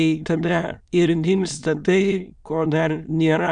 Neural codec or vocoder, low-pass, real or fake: autoencoder, 22.05 kHz, a latent of 192 numbers a frame, VITS, trained on many speakers; 9.9 kHz; fake